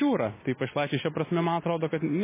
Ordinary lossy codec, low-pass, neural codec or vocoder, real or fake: MP3, 16 kbps; 3.6 kHz; none; real